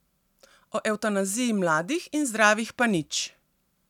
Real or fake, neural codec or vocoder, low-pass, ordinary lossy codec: real; none; 19.8 kHz; none